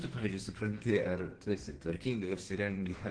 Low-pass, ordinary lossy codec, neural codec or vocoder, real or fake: 9.9 kHz; Opus, 16 kbps; codec, 32 kHz, 1.9 kbps, SNAC; fake